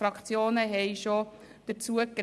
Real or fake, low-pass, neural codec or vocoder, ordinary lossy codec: real; none; none; none